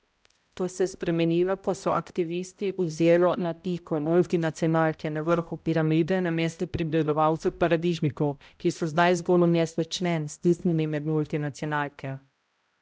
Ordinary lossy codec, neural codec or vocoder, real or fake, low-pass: none; codec, 16 kHz, 0.5 kbps, X-Codec, HuBERT features, trained on balanced general audio; fake; none